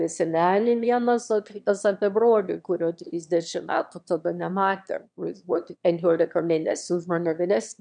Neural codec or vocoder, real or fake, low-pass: autoencoder, 22.05 kHz, a latent of 192 numbers a frame, VITS, trained on one speaker; fake; 9.9 kHz